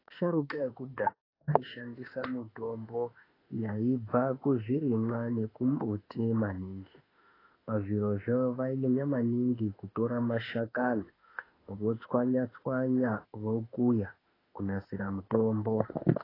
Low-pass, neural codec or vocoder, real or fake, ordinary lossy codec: 5.4 kHz; autoencoder, 48 kHz, 32 numbers a frame, DAC-VAE, trained on Japanese speech; fake; AAC, 24 kbps